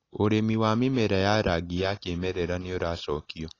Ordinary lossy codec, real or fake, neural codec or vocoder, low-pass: AAC, 32 kbps; real; none; 7.2 kHz